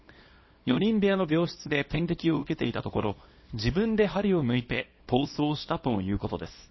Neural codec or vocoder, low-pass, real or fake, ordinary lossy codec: codec, 24 kHz, 0.9 kbps, WavTokenizer, small release; 7.2 kHz; fake; MP3, 24 kbps